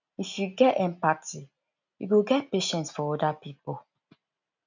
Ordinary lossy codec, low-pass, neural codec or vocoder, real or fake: none; 7.2 kHz; none; real